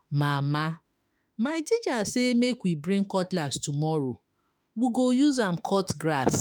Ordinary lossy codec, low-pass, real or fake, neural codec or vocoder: none; none; fake; autoencoder, 48 kHz, 32 numbers a frame, DAC-VAE, trained on Japanese speech